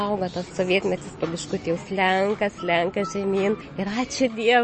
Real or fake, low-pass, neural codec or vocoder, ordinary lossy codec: real; 10.8 kHz; none; MP3, 32 kbps